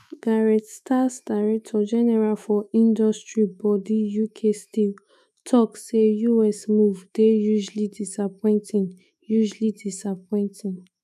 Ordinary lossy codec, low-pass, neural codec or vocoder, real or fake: none; 14.4 kHz; autoencoder, 48 kHz, 128 numbers a frame, DAC-VAE, trained on Japanese speech; fake